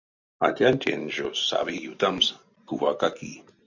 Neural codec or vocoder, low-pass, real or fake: none; 7.2 kHz; real